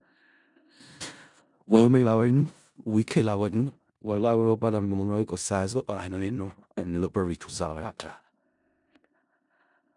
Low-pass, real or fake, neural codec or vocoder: 10.8 kHz; fake; codec, 16 kHz in and 24 kHz out, 0.4 kbps, LongCat-Audio-Codec, four codebook decoder